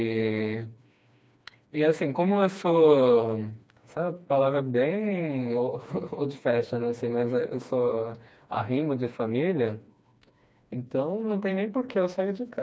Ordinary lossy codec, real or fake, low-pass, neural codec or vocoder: none; fake; none; codec, 16 kHz, 2 kbps, FreqCodec, smaller model